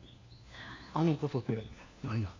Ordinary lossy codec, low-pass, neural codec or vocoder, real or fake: AAC, 32 kbps; 7.2 kHz; codec, 16 kHz, 1 kbps, FunCodec, trained on LibriTTS, 50 frames a second; fake